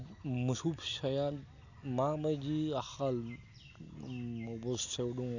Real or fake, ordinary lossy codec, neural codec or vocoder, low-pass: real; none; none; 7.2 kHz